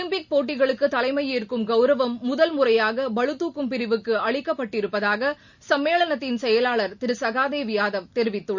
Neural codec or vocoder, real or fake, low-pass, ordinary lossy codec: none; real; 7.2 kHz; none